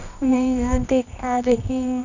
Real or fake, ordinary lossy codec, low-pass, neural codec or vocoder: fake; none; 7.2 kHz; codec, 24 kHz, 0.9 kbps, WavTokenizer, medium music audio release